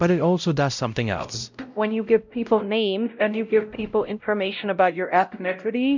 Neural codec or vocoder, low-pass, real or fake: codec, 16 kHz, 0.5 kbps, X-Codec, WavLM features, trained on Multilingual LibriSpeech; 7.2 kHz; fake